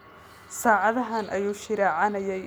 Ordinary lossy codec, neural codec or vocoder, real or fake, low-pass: none; none; real; none